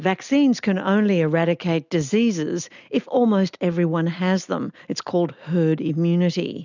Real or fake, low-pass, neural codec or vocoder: real; 7.2 kHz; none